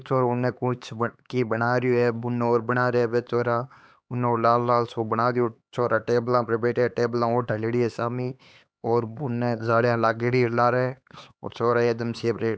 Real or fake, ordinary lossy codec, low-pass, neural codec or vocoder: fake; none; none; codec, 16 kHz, 4 kbps, X-Codec, HuBERT features, trained on LibriSpeech